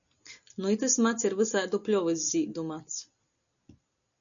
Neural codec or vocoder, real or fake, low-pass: none; real; 7.2 kHz